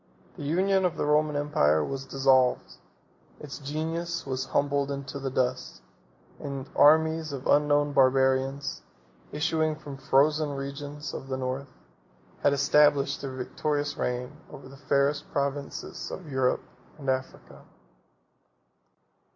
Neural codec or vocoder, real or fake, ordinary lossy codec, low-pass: none; real; MP3, 48 kbps; 7.2 kHz